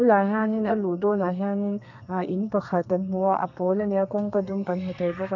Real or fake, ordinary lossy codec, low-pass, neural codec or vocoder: fake; none; 7.2 kHz; codec, 32 kHz, 1.9 kbps, SNAC